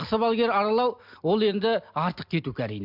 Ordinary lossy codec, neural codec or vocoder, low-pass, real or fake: MP3, 48 kbps; none; 5.4 kHz; real